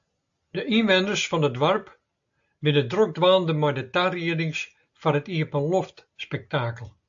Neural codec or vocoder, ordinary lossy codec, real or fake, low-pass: none; AAC, 64 kbps; real; 7.2 kHz